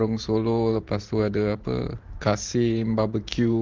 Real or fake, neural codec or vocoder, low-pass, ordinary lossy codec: fake; vocoder, 44.1 kHz, 128 mel bands every 512 samples, BigVGAN v2; 7.2 kHz; Opus, 16 kbps